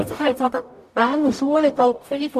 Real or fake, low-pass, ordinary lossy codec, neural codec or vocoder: fake; 14.4 kHz; none; codec, 44.1 kHz, 0.9 kbps, DAC